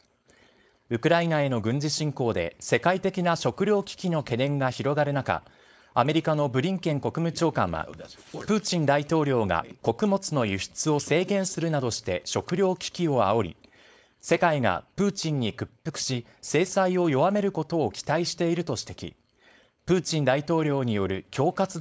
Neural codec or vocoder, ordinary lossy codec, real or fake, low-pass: codec, 16 kHz, 4.8 kbps, FACodec; none; fake; none